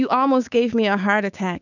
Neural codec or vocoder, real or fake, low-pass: codec, 16 kHz, 6 kbps, DAC; fake; 7.2 kHz